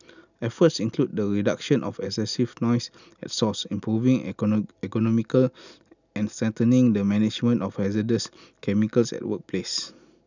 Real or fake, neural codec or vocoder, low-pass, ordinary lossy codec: real; none; 7.2 kHz; none